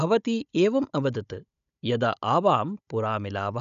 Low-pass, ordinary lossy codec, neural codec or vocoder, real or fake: 7.2 kHz; none; codec, 16 kHz, 16 kbps, FunCodec, trained on Chinese and English, 50 frames a second; fake